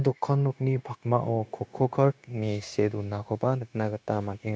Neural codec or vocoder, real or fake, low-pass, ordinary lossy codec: codec, 16 kHz, 0.9 kbps, LongCat-Audio-Codec; fake; none; none